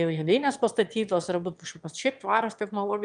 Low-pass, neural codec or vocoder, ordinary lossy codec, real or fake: 9.9 kHz; autoencoder, 22.05 kHz, a latent of 192 numbers a frame, VITS, trained on one speaker; Opus, 32 kbps; fake